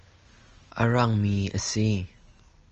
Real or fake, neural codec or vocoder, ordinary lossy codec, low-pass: real; none; Opus, 24 kbps; 7.2 kHz